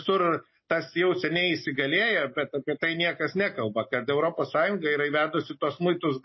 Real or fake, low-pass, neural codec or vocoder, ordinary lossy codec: real; 7.2 kHz; none; MP3, 24 kbps